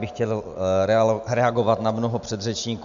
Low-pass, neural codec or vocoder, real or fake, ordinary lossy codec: 7.2 kHz; none; real; AAC, 96 kbps